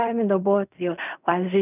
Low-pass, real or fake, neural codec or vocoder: 3.6 kHz; fake; codec, 16 kHz in and 24 kHz out, 0.4 kbps, LongCat-Audio-Codec, fine tuned four codebook decoder